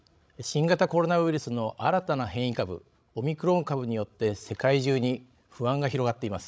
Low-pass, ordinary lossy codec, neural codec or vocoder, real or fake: none; none; codec, 16 kHz, 16 kbps, FreqCodec, larger model; fake